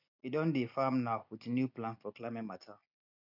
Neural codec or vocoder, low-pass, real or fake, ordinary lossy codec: none; 5.4 kHz; real; MP3, 48 kbps